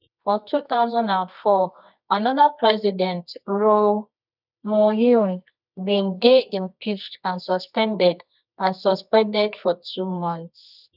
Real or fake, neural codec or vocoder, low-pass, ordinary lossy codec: fake; codec, 24 kHz, 0.9 kbps, WavTokenizer, medium music audio release; 5.4 kHz; none